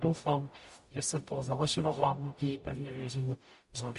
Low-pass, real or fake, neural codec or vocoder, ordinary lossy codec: 14.4 kHz; fake; codec, 44.1 kHz, 0.9 kbps, DAC; MP3, 48 kbps